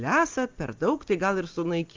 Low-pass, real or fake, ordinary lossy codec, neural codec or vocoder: 7.2 kHz; real; Opus, 32 kbps; none